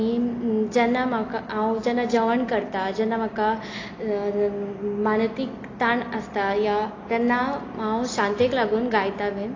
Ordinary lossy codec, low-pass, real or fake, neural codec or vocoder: AAC, 32 kbps; 7.2 kHz; real; none